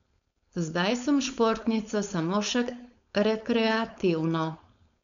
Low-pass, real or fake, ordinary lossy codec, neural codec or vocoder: 7.2 kHz; fake; none; codec, 16 kHz, 4.8 kbps, FACodec